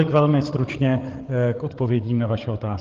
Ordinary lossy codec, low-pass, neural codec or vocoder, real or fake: Opus, 16 kbps; 7.2 kHz; codec, 16 kHz, 8 kbps, FreqCodec, larger model; fake